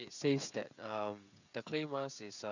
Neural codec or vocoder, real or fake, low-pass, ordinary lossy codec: codec, 16 kHz, 16 kbps, FreqCodec, smaller model; fake; 7.2 kHz; none